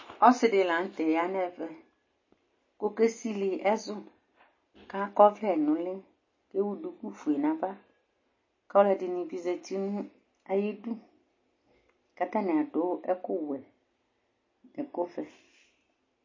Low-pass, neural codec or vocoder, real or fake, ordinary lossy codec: 7.2 kHz; none; real; MP3, 32 kbps